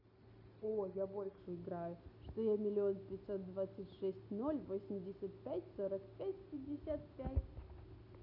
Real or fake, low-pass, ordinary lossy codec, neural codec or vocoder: real; 5.4 kHz; none; none